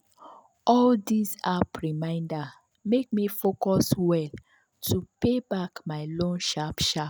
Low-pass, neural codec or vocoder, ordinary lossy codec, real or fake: none; none; none; real